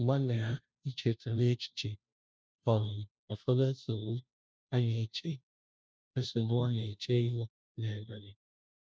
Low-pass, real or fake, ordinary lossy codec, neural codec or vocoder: none; fake; none; codec, 16 kHz, 0.5 kbps, FunCodec, trained on Chinese and English, 25 frames a second